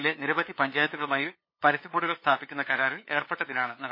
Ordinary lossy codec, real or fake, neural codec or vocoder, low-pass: MP3, 24 kbps; fake; codec, 16 kHz, 8 kbps, FunCodec, trained on LibriTTS, 25 frames a second; 5.4 kHz